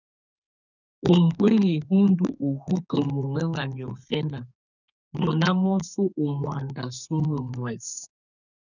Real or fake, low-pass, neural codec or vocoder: fake; 7.2 kHz; codec, 32 kHz, 1.9 kbps, SNAC